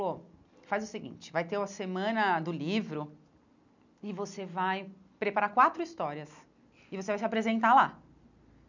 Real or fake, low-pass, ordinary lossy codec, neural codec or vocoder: real; 7.2 kHz; none; none